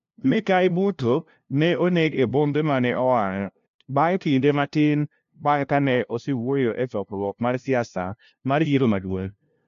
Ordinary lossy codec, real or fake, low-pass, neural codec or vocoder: AAC, 64 kbps; fake; 7.2 kHz; codec, 16 kHz, 0.5 kbps, FunCodec, trained on LibriTTS, 25 frames a second